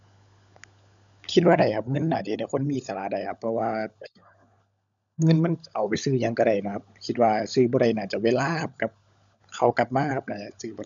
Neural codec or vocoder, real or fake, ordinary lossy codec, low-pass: codec, 16 kHz, 16 kbps, FunCodec, trained on LibriTTS, 50 frames a second; fake; none; 7.2 kHz